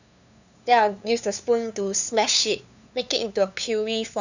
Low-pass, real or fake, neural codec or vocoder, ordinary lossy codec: 7.2 kHz; fake; codec, 16 kHz, 2 kbps, FunCodec, trained on LibriTTS, 25 frames a second; none